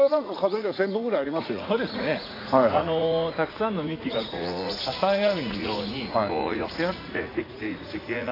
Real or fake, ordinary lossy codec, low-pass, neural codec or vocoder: fake; AAC, 24 kbps; 5.4 kHz; vocoder, 44.1 kHz, 80 mel bands, Vocos